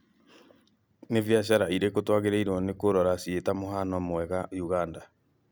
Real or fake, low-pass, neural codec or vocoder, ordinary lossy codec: real; none; none; none